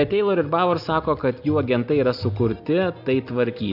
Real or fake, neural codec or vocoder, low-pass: real; none; 5.4 kHz